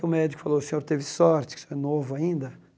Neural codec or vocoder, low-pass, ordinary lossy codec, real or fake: none; none; none; real